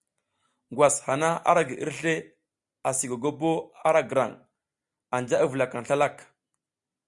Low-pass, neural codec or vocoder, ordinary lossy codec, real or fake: 10.8 kHz; none; Opus, 64 kbps; real